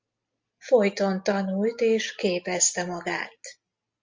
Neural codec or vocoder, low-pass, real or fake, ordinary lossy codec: none; 7.2 kHz; real; Opus, 32 kbps